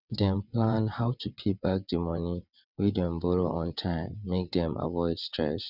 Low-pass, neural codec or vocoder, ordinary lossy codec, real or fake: 5.4 kHz; vocoder, 22.05 kHz, 80 mel bands, WaveNeXt; none; fake